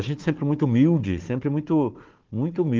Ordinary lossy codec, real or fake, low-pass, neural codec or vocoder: Opus, 16 kbps; fake; 7.2 kHz; codec, 16 kHz, 4 kbps, FunCodec, trained on Chinese and English, 50 frames a second